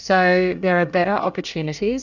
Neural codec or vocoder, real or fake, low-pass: codec, 24 kHz, 1 kbps, SNAC; fake; 7.2 kHz